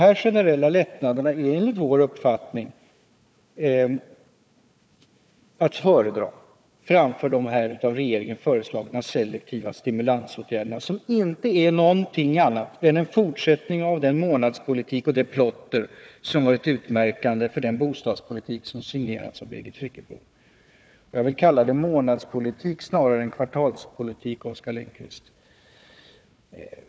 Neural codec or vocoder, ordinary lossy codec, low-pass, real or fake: codec, 16 kHz, 4 kbps, FunCodec, trained on Chinese and English, 50 frames a second; none; none; fake